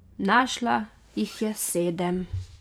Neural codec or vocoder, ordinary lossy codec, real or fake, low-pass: vocoder, 44.1 kHz, 128 mel bands every 512 samples, BigVGAN v2; none; fake; 19.8 kHz